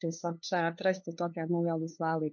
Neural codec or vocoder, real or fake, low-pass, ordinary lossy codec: codec, 16 kHz, 2 kbps, FunCodec, trained on LibriTTS, 25 frames a second; fake; 7.2 kHz; MP3, 48 kbps